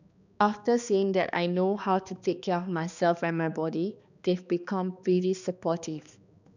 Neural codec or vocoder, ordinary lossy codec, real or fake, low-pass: codec, 16 kHz, 2 kbps, X-Codec, HuBERT features, trained on balanced general audio; none; fake; 7.2 kHz